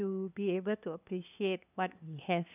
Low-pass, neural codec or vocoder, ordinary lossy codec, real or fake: 3.6 kHz; codec, 16 kHz, 2 kbps, FunCodec, trained on LibriTTS, 25 frames a second; none; fake